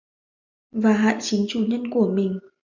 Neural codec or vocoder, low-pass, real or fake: none; 7.2 kHz; real